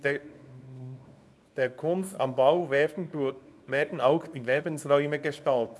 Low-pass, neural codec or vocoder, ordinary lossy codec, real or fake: none; codec, 24 kHz, 0.9 kbps, WavTokenizer, small release; none; fake